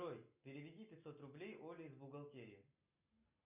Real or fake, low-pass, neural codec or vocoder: real; 3.6 kHz; none